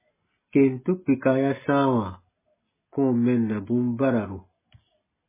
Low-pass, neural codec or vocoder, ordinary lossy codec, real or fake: 3.6 kHz; none; MP3, 16 kbps; real